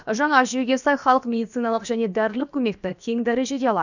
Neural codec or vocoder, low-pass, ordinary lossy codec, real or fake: codec, 16 kHz, about 1 kbps, DyCAST, with the encoder's durations; 7.2 kHz; none; fake